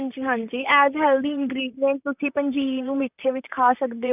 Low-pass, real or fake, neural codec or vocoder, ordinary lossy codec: 3.6 kHz; fake; vocoder, 44.1 kHz, 128 mel bands, Pupu-Vocoder; none